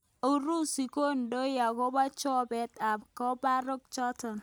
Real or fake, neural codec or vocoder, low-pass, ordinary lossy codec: real; none; none; none